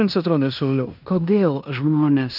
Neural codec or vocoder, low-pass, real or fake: codec, 16 kHz in and 24 kHz out, 0.9 kbps, LongCat-Audio-Codec, fine tuned four codebook decoder; 5.4 kHz; fake